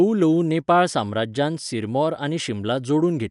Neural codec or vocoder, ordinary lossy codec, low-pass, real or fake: none; none; 10.8 kHz; real